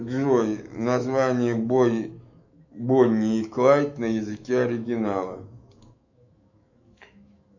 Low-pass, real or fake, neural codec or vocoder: 7.2 kHz; fake; autoencoder, 48 kHz, 128 numbers a frame, DAC-VAE, trained on Japanese speech